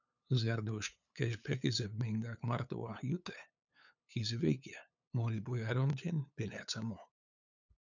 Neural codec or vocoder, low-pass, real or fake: codec, 16 kHz, 8 kbps, FunCodec, trained on LibriTTS, 25 frames a second; 7.2 kHz; fake